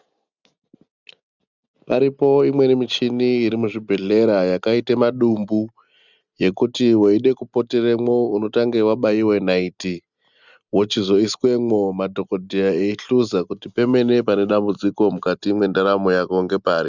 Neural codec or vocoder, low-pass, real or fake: none; 7.2 kHz; real